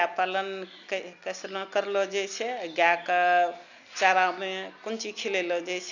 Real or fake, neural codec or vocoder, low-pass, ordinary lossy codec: real; none; 7.2 kHz; none